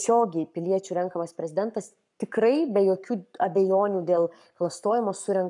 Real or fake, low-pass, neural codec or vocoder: fake; 10.8 kHz; codec, 44.1 kHz, 7.8 kbps, Pupu-Codec